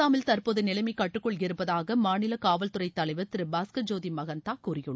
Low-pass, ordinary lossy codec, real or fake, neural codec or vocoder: none; none; real; none